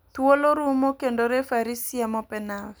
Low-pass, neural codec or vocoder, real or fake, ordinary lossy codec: none; none; real; none